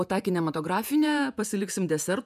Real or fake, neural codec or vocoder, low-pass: fake; vocoder, 48 kHz, 128 mel bands, Vocos; 14.4 kHz